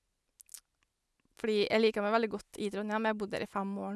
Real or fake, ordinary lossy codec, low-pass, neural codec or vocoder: real; none; none; none